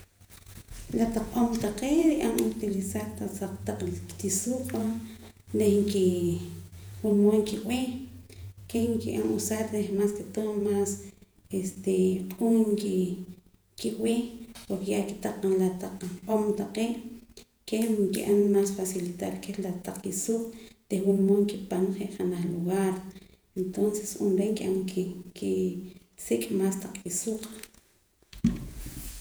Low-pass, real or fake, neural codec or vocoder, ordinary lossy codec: none; fake; vocoder, 48 kHz, 128 mel bands, Vocos; none